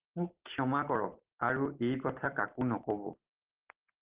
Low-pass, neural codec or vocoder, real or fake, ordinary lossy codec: 3.6 kHz; none; real; Opus, 16 kbps